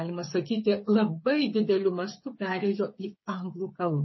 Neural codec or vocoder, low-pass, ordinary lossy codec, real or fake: codec, 16 kHz, 8 kbps, FreqCodec, smaller model; 7.2 kHz; MP3, 24 kbps; fake